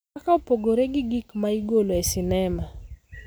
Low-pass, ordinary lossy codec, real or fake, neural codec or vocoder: none; none; real; none